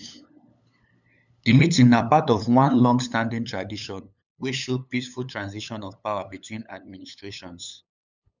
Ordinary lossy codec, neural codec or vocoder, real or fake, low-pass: none; codec, 16 kHz, 8 kbps, FunCodec, trained on LibriTTS, 25 frames a second; fake; 7.2 kHz